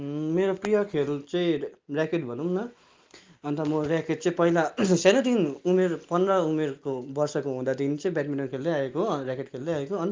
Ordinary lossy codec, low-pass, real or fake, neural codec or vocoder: Opus, 32 kbps; 7.2 kHz; real; none